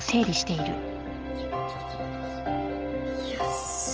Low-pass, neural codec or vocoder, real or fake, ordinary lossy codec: 7.2 kHz; none; real; Opus, 16 kbps